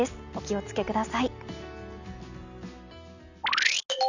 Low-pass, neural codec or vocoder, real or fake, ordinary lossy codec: 7.2 kHz; none; real; none